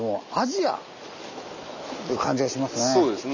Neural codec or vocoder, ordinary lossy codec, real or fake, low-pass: none; none; real; 7.2 kHz